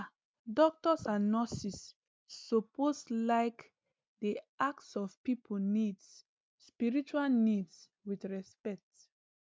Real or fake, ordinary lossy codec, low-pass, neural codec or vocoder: real; none; none; none